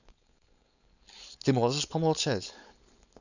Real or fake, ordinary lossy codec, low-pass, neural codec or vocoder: fake; none; 7.2 kHz; codec, 16 kHz, 4.8 kbps, FACodec